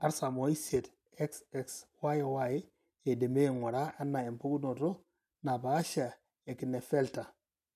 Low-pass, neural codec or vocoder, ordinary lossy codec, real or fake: 14.4 kHz; none; none; real